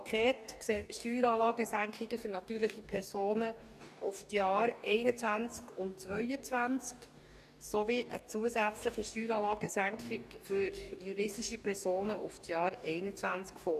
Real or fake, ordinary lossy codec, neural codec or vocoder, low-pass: fake; none; codec, 44.1 kHz, 2.6 kbps, DAC; 14.4 kHz